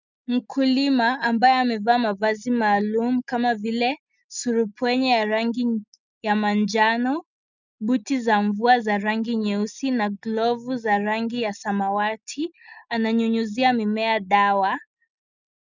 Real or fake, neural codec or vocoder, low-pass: real; none; 7.2 kHz